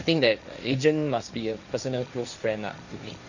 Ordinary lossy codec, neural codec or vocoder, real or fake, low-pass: none; codec, 16 kHz, 1.1 kbps, Voila-Tokenizer; fake; 7.2 kHz